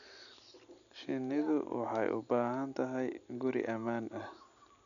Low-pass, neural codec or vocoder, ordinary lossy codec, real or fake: 7.2 kHz; none; none; real